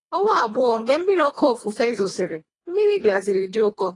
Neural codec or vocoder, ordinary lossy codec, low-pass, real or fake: codec, 24 kHz, 1.5 kbps, HILCodec; AAC, 32 kbps; 10.8 kHz; fake